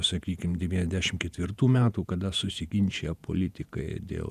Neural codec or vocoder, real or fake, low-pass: vocoder, 48 kHz, 128 mel bands, Vocos; fake; 14.4 kHz